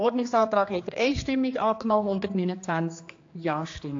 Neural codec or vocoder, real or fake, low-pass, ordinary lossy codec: codec, 16 kHz, 2 kbps, X-Codec, HuBERT features, trained on general audio; fake; 7.2 kHz; AAC, 48 kbps